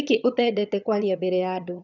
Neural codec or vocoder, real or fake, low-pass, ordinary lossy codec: vocoder, 22.05 kHz, 80 mel bands, HiFi-GAN; fake; 7.2 kHz; none